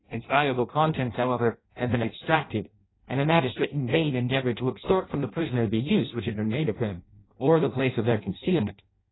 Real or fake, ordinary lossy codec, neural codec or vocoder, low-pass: fake; AAC, 16 kbps; codec, 16 kHz in and 24 kHz out, 0.6 kbps, FireRedTTS-2 codec; 7.2 kHz